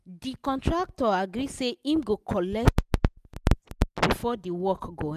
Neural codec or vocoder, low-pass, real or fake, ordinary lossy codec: none; 14.4 kHz; real; none